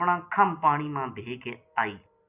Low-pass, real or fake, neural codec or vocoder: 3.6 kHz; real; none